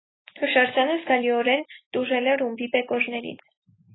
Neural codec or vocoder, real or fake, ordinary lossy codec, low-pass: none; real; AAC, 16 kbps; 7.2 kHz